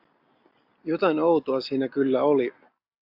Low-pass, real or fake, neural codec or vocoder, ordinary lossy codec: 5.4 kHz; real; none; AAC, 48 kbps